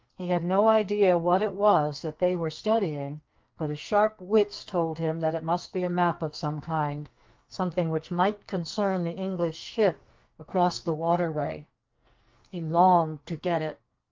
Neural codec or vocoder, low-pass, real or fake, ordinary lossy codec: codec, 44.1 kHz, 2.6 kbps, SNAC; 7.2 kHz; fake; Opus, 32 kbps